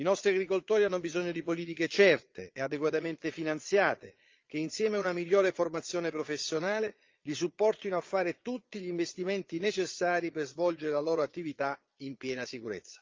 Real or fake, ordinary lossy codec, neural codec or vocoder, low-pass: fake; Opus, 32 kbps; vocoder, 22.05 kHz, 80 mel bands, Vocos; 7.2 kHz